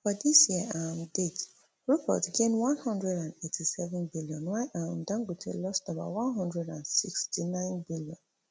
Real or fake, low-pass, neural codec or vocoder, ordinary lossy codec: real; none; none; none